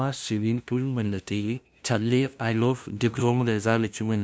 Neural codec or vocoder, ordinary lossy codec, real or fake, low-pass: codec, 16 kHz, 0.5 kbps, FunCodec, trained on LibriTTS, 25 frames a second; none; fake; none